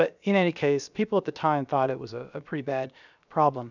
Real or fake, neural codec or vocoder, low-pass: fake; codec, 16 kHz, 0.7 kbps, FocalCodec; 7.2 kHz